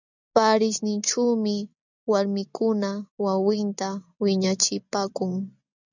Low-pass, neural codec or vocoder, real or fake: 7.2 kHz; none; real